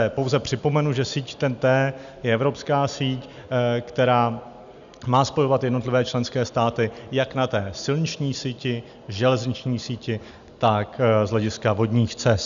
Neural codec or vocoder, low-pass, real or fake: none; 7.2 kHz; real